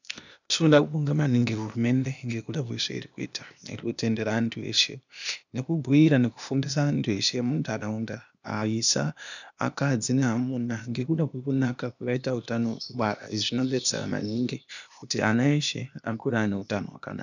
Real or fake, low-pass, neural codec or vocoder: fake; 7.2 kHz; codec, 16 kHz, 0.8 kbps, ZipCodec